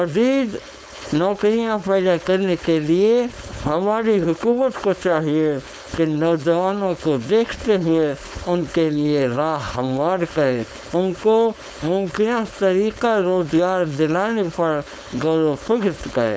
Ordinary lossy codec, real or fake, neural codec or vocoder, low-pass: none; fake; codec, 16 kHz, 4.8 kbps, FACodec; none